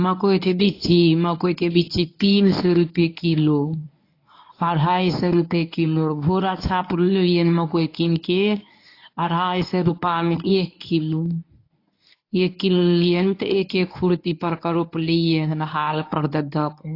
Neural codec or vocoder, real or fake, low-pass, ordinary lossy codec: codec, 24 kHz, 0.9 kbps, WavTokenizer, medium speech release version 1; fake; 5.4 kHz; AAC, 32 kbps